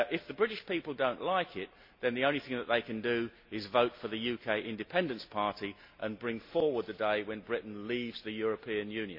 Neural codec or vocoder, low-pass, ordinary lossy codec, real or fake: none; 5.4 kHz; MP3, 32 kbps; real